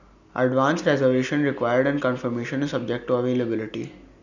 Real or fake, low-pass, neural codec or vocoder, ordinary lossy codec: real; 7.2 kHz; none; none